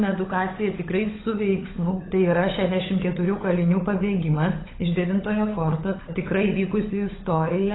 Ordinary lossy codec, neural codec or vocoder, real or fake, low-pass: AAC, 16 kbps; codec, 16 kHz, 16 kbps, FunCodec, trained on LibriTTS, 50 frames a second; fake; 7.2 kHz